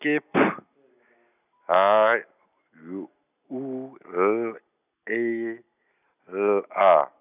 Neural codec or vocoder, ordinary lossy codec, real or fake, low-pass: none; none; real; 3.6 kHz